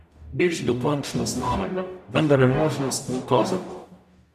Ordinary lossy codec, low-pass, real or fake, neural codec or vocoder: none; 14.4 kHz; fake; codec, 44.1 kHz, 0.9 kbps, DAC